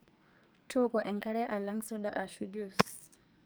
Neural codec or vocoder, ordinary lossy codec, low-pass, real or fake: codec, 44.1 kHz, 2.6 kbps, SNAC; none; none; fake